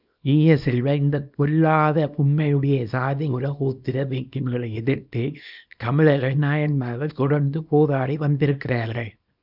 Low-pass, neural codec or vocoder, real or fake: 5.4 kHz; codec, 24 kHz, 0.9 kbps, WavTokenizer, small release; fake